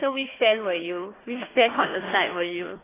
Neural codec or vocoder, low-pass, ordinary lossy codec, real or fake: codec, 16 kHz, 1 kbps, FunCodec, trained on Chinese and English, 50 frames a second; 3.6 kHz; AAC, 16 kbps; fake